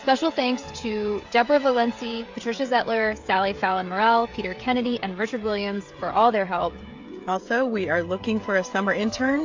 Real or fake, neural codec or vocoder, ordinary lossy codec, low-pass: fake; codec, 16 kHz, 16 kbps, FreqCodec, smaller model; AAC, 48 kbps; 7.2 kHz